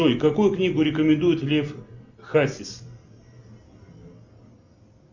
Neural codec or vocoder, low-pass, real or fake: none; 7.2 kHz; real